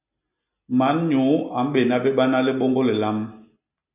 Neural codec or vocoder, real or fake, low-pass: none; real; 3.6 kHz